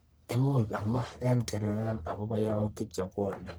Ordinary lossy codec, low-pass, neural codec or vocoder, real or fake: none; none; codec, 44.1 kHz, 1.7 kbps, Pupu-Codec; fake